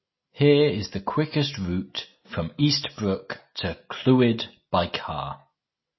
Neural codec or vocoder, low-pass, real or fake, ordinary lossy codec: none; 7.2 kHz; real; MP3, 24 kbps